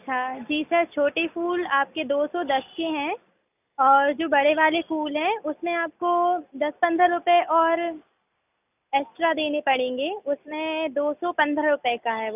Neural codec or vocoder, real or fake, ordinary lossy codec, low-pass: none; real; none; 3.6 kHz